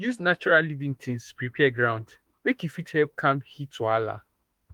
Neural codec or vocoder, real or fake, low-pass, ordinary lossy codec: autoencoder, 48 kHz, 32 numbers a frame, DAC-VAE, trained on Japanese speech; fake; 19.8 kHz; Opus, 32 kbps